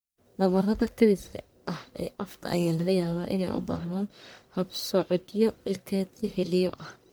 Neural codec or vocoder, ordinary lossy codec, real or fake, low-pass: codec, 44.1 kHz, 1.7 kbps, Pupu-Codec; none; fake; none